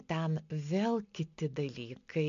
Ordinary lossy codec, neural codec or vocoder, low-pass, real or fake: AAC, 64 kbps; none; 7.2 kHz; real